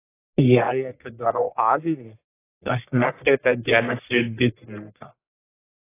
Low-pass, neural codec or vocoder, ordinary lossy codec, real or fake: 3.6 kHz; codec, 44.1 kHz, 1.7 kbps, Pupu-Codec; AAC, 16 kbps; fake